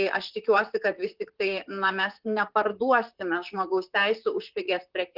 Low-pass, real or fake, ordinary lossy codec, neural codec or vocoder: 5.4 kHz; fake; Opus, 32 kbps; vocoder, 44.1 kHz, 128 mel bands, Pupu-Vocoder